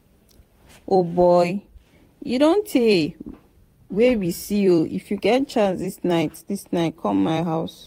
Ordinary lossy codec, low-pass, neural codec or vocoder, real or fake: AAC, 48 kbps; 19.8 kHz; vocoder, 44.1 kHz, 128 mel bands every 256 samples, BigVGAN v2; fake